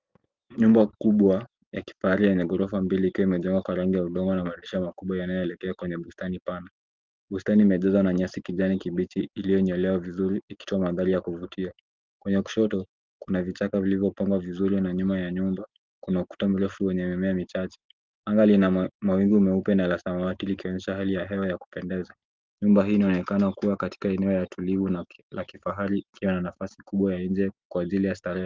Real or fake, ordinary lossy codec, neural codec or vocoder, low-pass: real; Opus, 32 kbps; none; 7.2 kHz